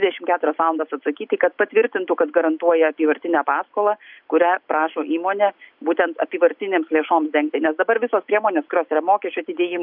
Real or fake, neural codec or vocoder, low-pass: real; none; 5.4 kHz